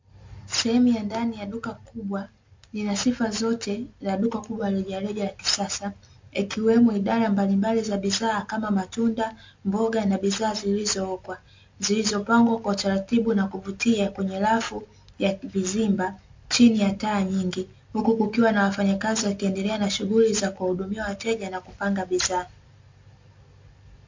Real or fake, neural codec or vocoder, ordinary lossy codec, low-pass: real; none; MP3, 48 kbps; 7.2 kHz